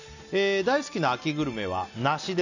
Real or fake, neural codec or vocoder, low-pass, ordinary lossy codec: real; none; 7.2 kHz; none